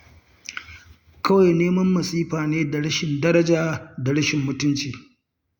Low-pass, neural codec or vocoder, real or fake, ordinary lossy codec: 19.8 kHz; none; real; Opus, 64 kbps